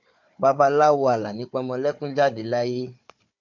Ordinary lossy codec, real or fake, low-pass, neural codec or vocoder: MP3, 48 kbps; fake; 7.2 kHz; codec, 16 kHz, 4 kbps, FunCodec, trained on Chinese and English, 50 frames a second